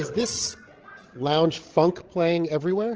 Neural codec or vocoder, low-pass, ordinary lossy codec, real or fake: codec, 16 kHz, 16 kbps, FreqCodec, larger model; 7.2 kHz; Opus, 16 kbps; fake